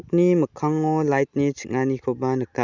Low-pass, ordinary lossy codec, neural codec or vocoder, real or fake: 7.2 kHz; Opus, 64 kbps; none; real